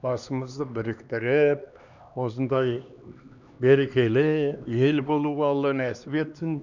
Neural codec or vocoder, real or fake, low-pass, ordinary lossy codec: codec, 16 kHz, 2 kbps, X-Codec, HuBERT features, trained on LibriSpeech; fake; 7.2 kHz; none